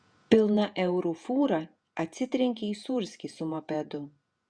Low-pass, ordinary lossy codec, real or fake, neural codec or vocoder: 9.9 kHz; Opus, 64 kbps; fake; vocoder, 48 kHz, 128 mel bands, Vocos